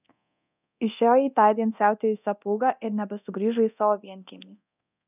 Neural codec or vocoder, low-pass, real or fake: codec, 24 kHz, 0.9 kbps, DualCodec; 3.6 kHz; fake